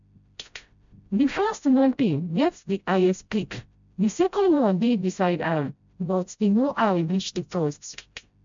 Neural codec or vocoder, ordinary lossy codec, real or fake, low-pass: codec, 16 kHz, 0.5 kbps, FreqCodec, smaller model; MP3, 64 kbps; fake; 7.2 kHz